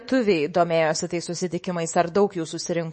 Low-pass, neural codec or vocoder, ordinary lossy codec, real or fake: 10.8 kHz; codec, 24 kHz, 3.1 kbps, DualCodec; MP3, 32 kbps; fake